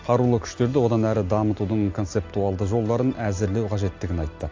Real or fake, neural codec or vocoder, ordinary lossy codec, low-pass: real; none; AAC, 48 kbps; 7.2 kHz